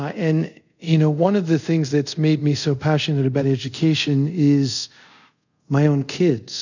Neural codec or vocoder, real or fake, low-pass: codec, 24 kHz, 0.5 kbps, DualCodec; fake; 7.2 kHz